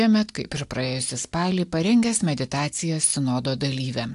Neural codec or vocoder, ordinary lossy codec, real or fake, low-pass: none; AAC, 64 kbps; real; 10.8 kHz